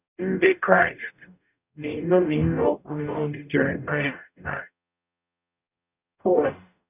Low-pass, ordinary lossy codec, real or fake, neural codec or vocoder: 3.6 kHz; none; fake; codec, 44.1 kHz, 0.9 kbps, DAC